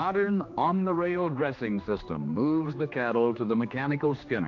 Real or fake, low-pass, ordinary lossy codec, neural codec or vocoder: fake; 7.2 kHz; AAC, 48 kbps; codec, 16 kHz, 2 kbps, X-Codec, HuBERT features, trained on general audio